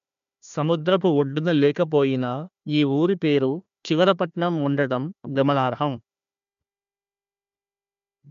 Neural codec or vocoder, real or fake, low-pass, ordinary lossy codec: codec, 16 kHz, 1 kbps, FunCodec, trained on Chinese and English, 50 frames a second; fake; 7.2 kHz; MP3, 64 kbps